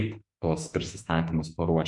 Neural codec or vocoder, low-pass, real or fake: autoencoder, 48 kHz, 32 numbers a frame, DAC-VAE, trained on Japanese speech; 10.8 kHz; fake